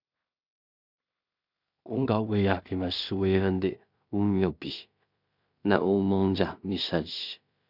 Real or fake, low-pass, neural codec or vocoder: fake; 5.4 kHz; codec, 16 kHz in and 24 kHz out, 0.4 kbps, LongCat-Audio-Codec, two codebook decoder